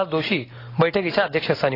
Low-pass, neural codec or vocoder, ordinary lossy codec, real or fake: 5.4 kHz; none; AAC, 24 kbps; real